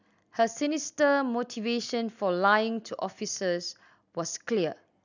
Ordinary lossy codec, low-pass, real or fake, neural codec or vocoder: none; 7.2 kHz; real; none